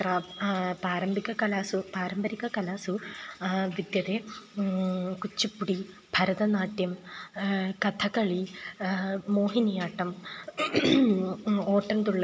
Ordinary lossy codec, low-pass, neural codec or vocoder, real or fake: none; none; none; real